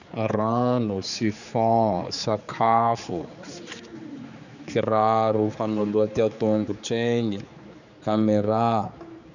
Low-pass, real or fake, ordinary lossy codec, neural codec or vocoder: 7.2 kHz; fake; none; codec, 16 kHz, 4 kbps, X-Codec, HuBERT features, trained on general audio